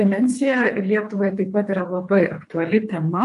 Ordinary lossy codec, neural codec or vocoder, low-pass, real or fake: AAC, 64 kbps; codec, 24 kHz, 3 kbps, HILCodec; 10.8 kHz; fake